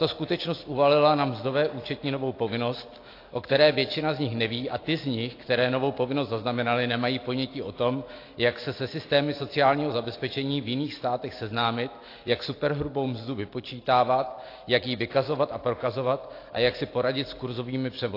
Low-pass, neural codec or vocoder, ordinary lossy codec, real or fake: 5.4 kHz; none; AAC, 32 kbps; real